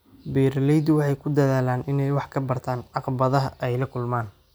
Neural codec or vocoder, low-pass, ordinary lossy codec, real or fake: none; none; none; real